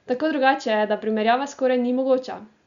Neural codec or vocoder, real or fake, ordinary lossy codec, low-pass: none; real; Opus, 64 kbps; 7.2 kHz